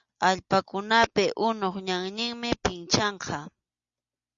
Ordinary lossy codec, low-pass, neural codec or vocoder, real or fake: Opus, 64 kbps; 7.2 kHz; none; real